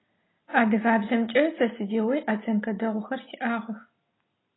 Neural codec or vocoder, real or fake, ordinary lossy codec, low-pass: none; real; AAC, 16 kbps; 7.2 kHz